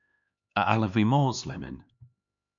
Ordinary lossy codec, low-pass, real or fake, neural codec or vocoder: MP3, 64 kbps; 7.2 kHz; fake; codec, 16 kHz, 4 kbps, X-Codec, HuBERT features, trained on LibriSpeech